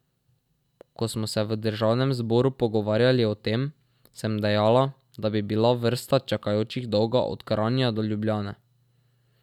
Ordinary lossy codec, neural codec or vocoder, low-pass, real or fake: none; none; 19.8 kHz; real